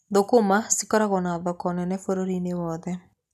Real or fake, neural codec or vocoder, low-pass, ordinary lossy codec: real; none; 14.4 kHz; none